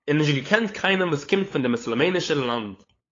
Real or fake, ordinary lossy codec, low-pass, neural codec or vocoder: fake; AAC, 32 kbps; 7.2 kHz; codec, 16 kHz, 8 kbps, FunCodec, trained on LibriTTS, 25 frames a second